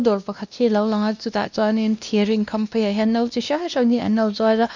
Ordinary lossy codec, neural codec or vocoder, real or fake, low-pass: none; codec, 16 kHz, 1 kbps, X-Codec, WavLM features, trained on Multilingual LibriSpeech; fake; 7.2 kHz